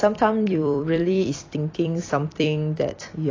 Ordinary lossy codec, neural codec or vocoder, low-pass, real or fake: AAC, 32 kbps; vocoder, 44.1 kHz, 128 mel bands every 256 samples, BigVGAN v2; 7.2 kHz; fake